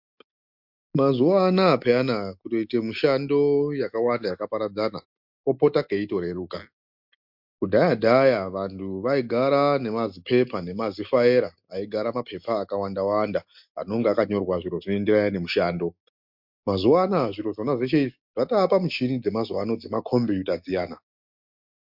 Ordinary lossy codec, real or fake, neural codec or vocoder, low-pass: MP3, 48 kbps; real; none; 5.4 kHz